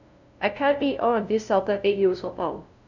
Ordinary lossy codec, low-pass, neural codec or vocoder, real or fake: none; 7.2 kHz; codec, 16 kHz, 0.5 kbps, FunCodec, trained on LibriTTS, 25 frames a second; fake